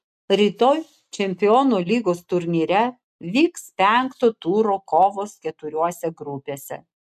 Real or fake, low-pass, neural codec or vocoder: real; 14.4 kHz; none